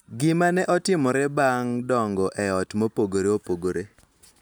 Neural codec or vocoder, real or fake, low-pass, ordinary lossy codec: none; real; none; none